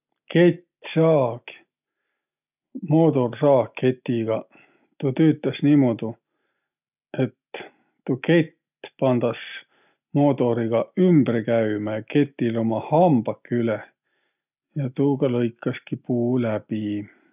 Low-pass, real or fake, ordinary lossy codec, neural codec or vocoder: 3.6 kHz; real; none; none